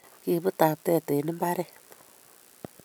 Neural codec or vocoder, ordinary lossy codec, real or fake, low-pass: none; none; real; none